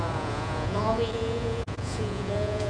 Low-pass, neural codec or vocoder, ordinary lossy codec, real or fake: 9.9 kHz; vocoder, 48 kHz, 128 mel bands, Vocos; MP3, 48 kbps; fake